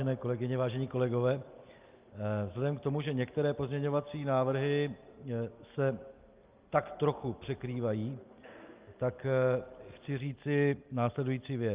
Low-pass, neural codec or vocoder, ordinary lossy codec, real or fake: 3.6 kHz; none; Opus, 24 kbps; real